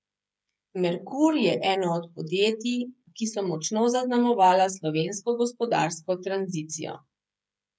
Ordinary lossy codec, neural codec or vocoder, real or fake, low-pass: none; codec, 16 kHz, 16 kbps, FreqCodec, smaller model; fake; none